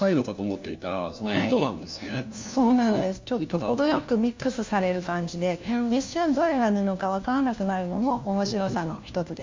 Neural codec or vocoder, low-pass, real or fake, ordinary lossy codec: codec, 16 kHz, 1 kbps, FunCodec, trained on LibriTTS, 50 frames a second; 7.2 kHz; fake; MP3, 64 kbps